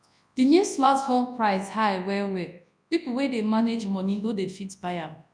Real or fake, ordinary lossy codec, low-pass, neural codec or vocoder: fake; none; 9.9 kHz; codec, 24 kHz, 0.9 kbps, WavTokenizer, large speech release